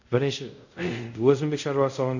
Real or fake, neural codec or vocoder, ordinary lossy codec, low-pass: fake; codec, 24 kHz, 0.5 kbps, DualCodec; none; 7.2 kHz